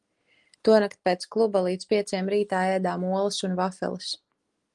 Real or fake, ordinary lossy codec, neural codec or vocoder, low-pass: real; Opus, 24 kbps; none; 10.8 kHz